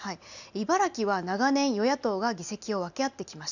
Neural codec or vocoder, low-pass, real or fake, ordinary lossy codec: none; 7.2 kHz; real; none